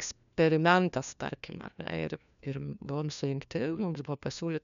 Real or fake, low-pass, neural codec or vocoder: fake; 7.2 kHz; codec, 16 kHz, 1 kbps, FunCodec, trained on LibriTTS, 50 frames a second